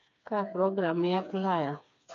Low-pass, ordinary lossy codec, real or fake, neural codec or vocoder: 7.2 kHz; none; fake; codec, 16 kHz, 4 kbps, FreqCodec, smaller model